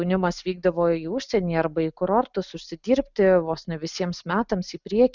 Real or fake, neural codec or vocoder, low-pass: real; none; 7.2 kHz